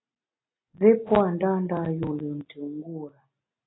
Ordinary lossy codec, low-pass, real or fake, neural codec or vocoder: AAC, 16 kbps; 7.2 kHz; real; none